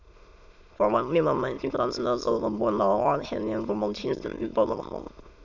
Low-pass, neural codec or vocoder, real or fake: 7.2 kHz; autoencoder, 22.05 kHz, a latent of 192 numbers a frame, VITS, trained on many speakers; fake